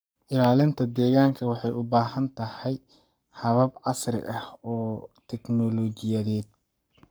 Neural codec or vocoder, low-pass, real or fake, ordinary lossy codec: codec, 44.1 kHz, 7.8 kbps, Pupu-Codec; none; fake; none